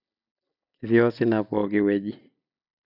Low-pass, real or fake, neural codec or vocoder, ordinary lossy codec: 5.4 kHz; real; none; none